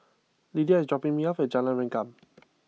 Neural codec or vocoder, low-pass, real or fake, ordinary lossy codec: none; none; real; none